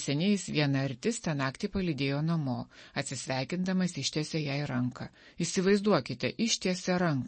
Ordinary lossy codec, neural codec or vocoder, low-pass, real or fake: MP3, 32 kbps; none; 10.8 kHz; real